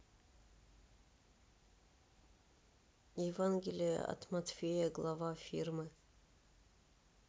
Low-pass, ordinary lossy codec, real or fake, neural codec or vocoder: none; none; real; none